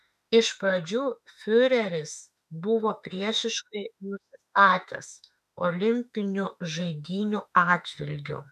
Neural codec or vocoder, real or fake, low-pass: autoencoder, 48 kHz, 32 numbers a frame, DAC-VAE, trained on Japanese speech; fake; 14.4 kHz